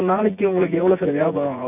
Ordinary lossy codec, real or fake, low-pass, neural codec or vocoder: none; fake; 3.6 kHz; vocoder, 24 kHz, 100 mel bands, Vocos